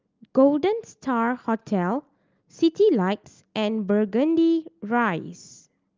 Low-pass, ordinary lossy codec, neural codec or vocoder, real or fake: 7.2 kHz; Opus, 24 kbps; none; real